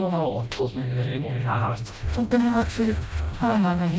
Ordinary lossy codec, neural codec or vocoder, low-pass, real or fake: none; codec, 16 kHz, 0.5 kbps, FreqCodec, smaller model; none; fake